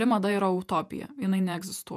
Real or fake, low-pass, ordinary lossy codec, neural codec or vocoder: fake; 14.4 kHz; MP3, 96 kbps; vocoder, 48 kHz, 128 mel bands, Vocos